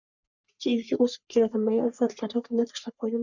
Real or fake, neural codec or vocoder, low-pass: fake; codec, 44.1 kHz, 3.4 kbps, Pupu-Codec; 7.2 kHz